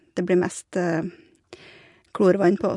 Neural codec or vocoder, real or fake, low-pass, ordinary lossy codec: none; real; 10.8 kHz; MP3, 64 kbps